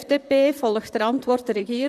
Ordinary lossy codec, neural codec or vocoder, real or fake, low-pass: none; vocoder, 44.1 kHz, 128 mel bands, Pupu-Vocoder; fake; 14.4 kHz